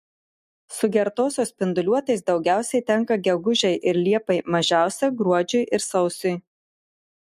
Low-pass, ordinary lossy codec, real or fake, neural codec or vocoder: 14.4 kHz; MP3, 64 kbps; real; none